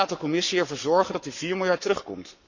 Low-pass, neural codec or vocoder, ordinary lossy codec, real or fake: 7.2 kHz; codec, 44.1 kHz, 7.8 kbps, Pupu-Codec; none; fake